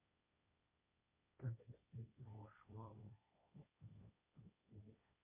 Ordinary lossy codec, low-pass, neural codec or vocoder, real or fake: MP3, 24 kbps; 3.6 kHz; codec, 16 kHz, 1.1 kbps, Voila-Tokenizer; fake